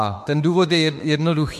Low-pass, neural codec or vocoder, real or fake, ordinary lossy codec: 14.4 kHz; autoencoder, 48 kHz, 32 numbers a frame, DAC-VAE, trained on Japanese speech; fake; MP3, 64 kbps